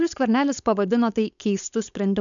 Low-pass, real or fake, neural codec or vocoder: 7.2 kHz; fake; codec, 16 kHz, 4.8 kbps, FACodec